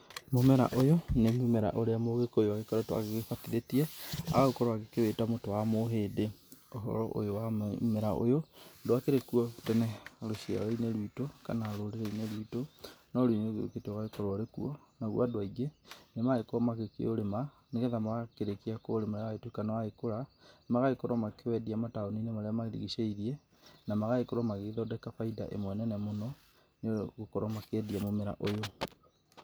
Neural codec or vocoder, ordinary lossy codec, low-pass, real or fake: none; none; none; real